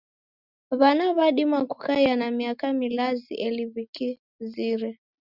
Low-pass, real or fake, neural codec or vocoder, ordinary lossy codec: 5.4 kHz; real; none; Opus, 64 kbps